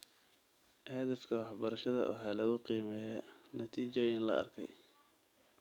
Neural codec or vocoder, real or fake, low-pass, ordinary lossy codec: codec, 44.1 kHz, 7.8 kbps, DAC; fake; 19.8 kHz; none